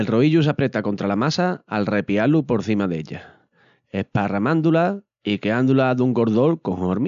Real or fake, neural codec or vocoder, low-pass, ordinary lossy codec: real; none; 7.2 kHz; none